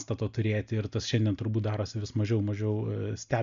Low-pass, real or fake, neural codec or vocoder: 7.2 kHz; real; none